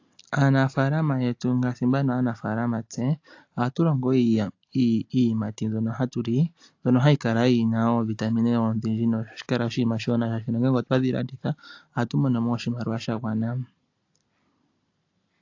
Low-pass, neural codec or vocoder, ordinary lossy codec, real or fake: 7.2 kHz; none; AAC, 48 kbps; real